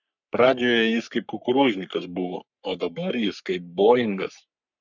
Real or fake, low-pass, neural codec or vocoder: fake; 7.2 kHz; codec, 44.1 kHz, 3.4 kbps, Pupu-Codec